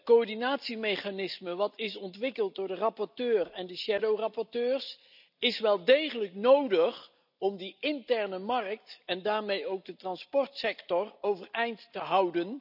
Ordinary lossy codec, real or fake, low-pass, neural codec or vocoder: none; real; 5.4 kHz; none